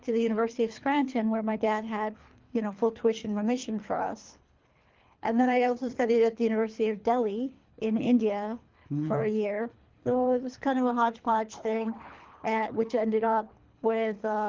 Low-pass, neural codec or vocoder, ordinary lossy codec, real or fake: 7.2 kHz; codec, 24 kHz, 3 kbps, HILCodec; Opus, 32 kbps; fake